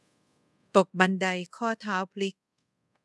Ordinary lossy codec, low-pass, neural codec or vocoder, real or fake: none; none; codec, 24 kHz, 0.5 kbps, DualCodec; fake